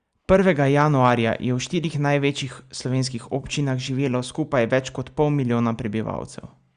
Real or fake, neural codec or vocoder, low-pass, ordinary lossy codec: real; none; 9.9 kHz; Opus, 64 kbps